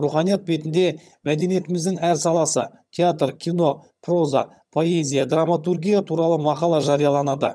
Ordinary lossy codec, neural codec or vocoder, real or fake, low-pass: none; vocoder, 22.05 kHz, 80 mel bands, HiFi-GAN; fake; none